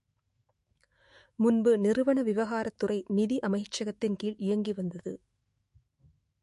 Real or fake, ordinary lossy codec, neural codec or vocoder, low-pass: fake; MP3, 48 kbps; autoencoder, 48 kHz, 128 numbers a frame, DAC-VAE, trained on Japanese speech; 14.4 kHz